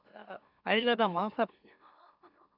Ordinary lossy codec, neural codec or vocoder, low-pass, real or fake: none; autoencoder, 44.1 kHz, a latent of 192 numbers a frame, MeloTTS; 5.4 kHz; fake